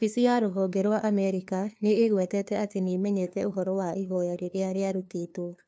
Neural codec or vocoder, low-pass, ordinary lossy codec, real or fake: codec, 16 kHz, 2 kbps, FunCodec, trained on LibriTTS, 25 frames a second; none; none; fake